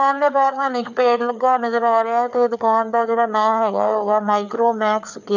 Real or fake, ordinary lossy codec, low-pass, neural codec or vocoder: fake; none; 7.2 kHz; codec, 16 kHz, 4 kbps, FreqCodec, larger model